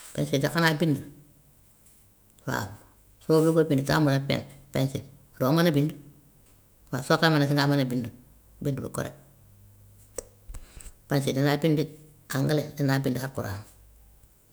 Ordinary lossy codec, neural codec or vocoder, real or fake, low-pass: none; autoencoder, 48 kHz, 128 numbers a frame, DAC-VAE, trained on Japanese speech; fake; none